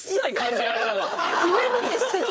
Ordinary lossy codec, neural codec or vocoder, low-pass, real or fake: none; codec, 16 kHz, 4 kbps, FreqCodec, larger model; none; fake